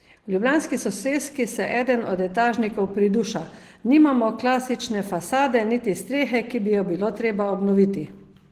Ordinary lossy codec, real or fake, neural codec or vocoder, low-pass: Opus, 16 kbps; real; none; 14.4 kHz